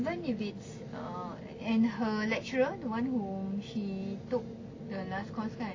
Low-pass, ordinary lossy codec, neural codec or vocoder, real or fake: 7.2 kHz; AAC, 32 kbps; none; real